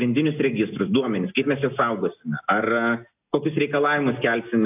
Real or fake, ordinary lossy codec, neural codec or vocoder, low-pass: real; AAC, 32 kbps; none; 3.6 kHz